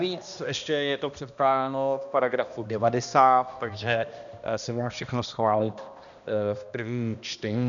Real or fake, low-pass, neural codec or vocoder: fake; 7.2 kHz; codec, 16 kHz, 1 kbps, X-Codec, HuBERT features, trained on balanced general audio